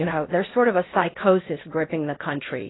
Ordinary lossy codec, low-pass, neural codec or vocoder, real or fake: AAC, 16 kbps; 7.2 kHz; codec, 16 kHz in and 24 kHz out, 0.8 kbps, FocalCodec, streaming, 65536 codes; fake